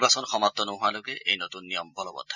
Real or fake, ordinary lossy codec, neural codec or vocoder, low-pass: real; none; none; 7.2 kHz